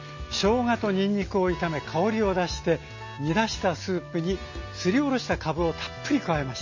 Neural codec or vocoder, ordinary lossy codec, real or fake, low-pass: none; MP3, 32 kbps; real; 7.2 kHz